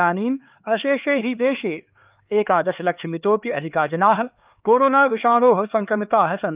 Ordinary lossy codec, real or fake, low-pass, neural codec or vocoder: Opus, 24 kbps; fake; 3.6 kHz; codec, 16 kHz, 4 kbps, X-Codec, HuBERT features, trained on LibriSpeech